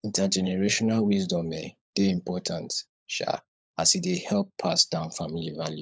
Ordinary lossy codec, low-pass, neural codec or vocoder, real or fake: none; none; codec, 16 kHz, 16 kbps, FunCodec, trained on LibriTTS, 50 frames a second; fake